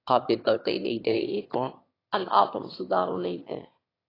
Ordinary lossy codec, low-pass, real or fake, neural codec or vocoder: AAC, 24 kbps; 5.4 kHz; fake; autoencoder, 22.05 kHz, a latent of 192 numbers a frame, VITS, trained on one speaker